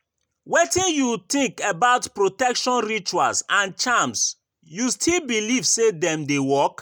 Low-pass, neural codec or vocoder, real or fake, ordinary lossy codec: none; none; real; none